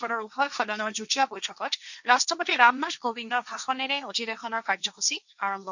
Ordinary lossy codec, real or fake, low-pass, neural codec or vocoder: none; fake; 7.2 kHz; codec, 16 kHz, 1.1 kbps, Voila-Tokenizer